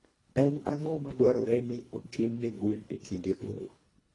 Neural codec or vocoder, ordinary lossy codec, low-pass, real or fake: codec, 24 kHz, 1.5 kbps, HILCodec; AAC, 32 kbps; 10.8 kHz; fake